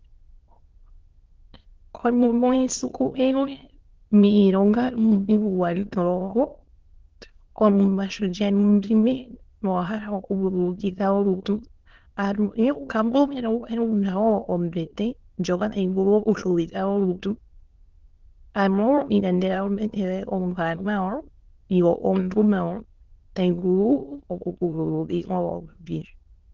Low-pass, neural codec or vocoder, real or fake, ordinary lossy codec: 7.2 kHz; autoencoder, 22.05 kHz, a latent of 192 numbers a frame, VITS, trained on many speakers; fake; Opus, 16 kbps